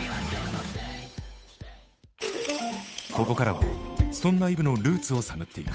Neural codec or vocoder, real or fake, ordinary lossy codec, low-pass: codec, 16 kHz, 8 kbps, FunCodec, trained on Chinese and English, 25 frames a second; fake; none; none